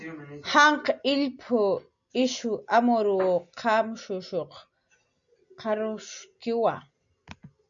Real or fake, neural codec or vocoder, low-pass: real; none; 7.2 kHz